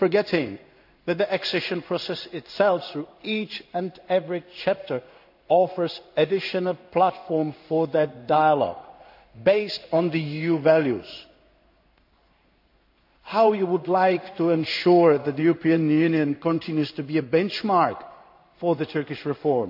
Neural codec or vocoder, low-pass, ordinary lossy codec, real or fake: codec, 16 kHz in and 24 kHz out, 1 kbps, XY-Tokenizer; 5.4 kHz; AAC, 48 kbps; fake